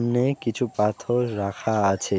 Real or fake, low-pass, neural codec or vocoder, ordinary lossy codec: real; none; none; none